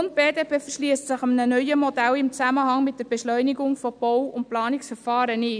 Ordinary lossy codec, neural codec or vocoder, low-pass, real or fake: none; none; 9.9 kHz; real